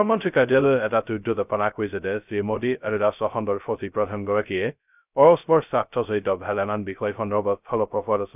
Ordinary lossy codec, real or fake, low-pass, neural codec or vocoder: none; fake; 3.6 kHz; codec, 16 kHz, 0.2 kbps, FocalCodec